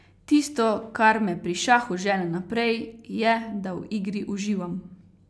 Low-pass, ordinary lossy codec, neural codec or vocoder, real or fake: none; none; none; real